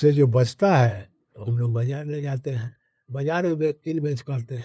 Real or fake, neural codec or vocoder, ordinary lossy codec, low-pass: fake; codec, 16 kHz, 2 kbps, FunCodec, trained on LibriTTS, 25 frames a second; none; none